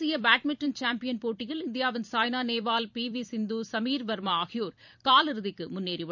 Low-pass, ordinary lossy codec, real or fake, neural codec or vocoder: 7.2 kHz; none; fake; vocoder, 44.1 kHz, 128 mel bands every 256 samples, BigVGAN v2